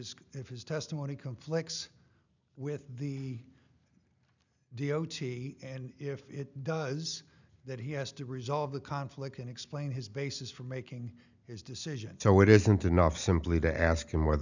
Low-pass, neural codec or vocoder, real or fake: 7.2 kHz; none; real